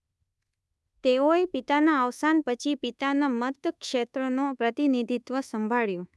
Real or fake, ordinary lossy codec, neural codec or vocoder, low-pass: fake; none; codec, 24 kHz, 1.2 kbps, DualCodec; none